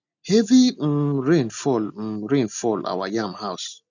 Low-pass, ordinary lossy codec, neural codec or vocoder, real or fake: 7.2 kHz; none; none; real